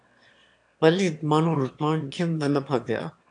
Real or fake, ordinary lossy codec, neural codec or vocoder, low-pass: fake; AAC, 64 kbps; autoencoder, 22.05 kHz, a latent of 192 numbers a frame, VITS, trained on one speaker; 9.9 kHz